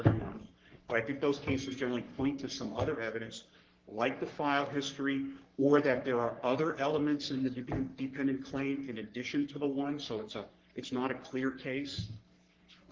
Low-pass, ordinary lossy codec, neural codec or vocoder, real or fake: 7.2 kHz; Opus, 16 kbps; codec, 44.1 kHz, 3.4 kbps, Pupu-Codec; fake